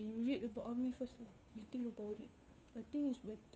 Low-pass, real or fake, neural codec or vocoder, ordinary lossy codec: none; fake; codec, 16 kHz, 2 kbps, FunCodec, trained on Chinese and English, 25 frames a second; none